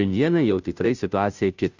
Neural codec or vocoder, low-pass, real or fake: codec, 16 kHz, 0.5 kbps, FunCodec, trained on Chinese and English, 25 frames a second; 7.2 kHz; fake